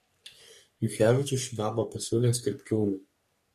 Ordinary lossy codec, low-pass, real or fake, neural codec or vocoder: MP3, 64 kbps; 14.4 kHz; fake; codec, 44.1 kHz, 3.4 kbps, Pupu-Codec